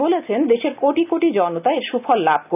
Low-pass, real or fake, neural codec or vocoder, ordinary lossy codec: 3.6 kHz; real; none; none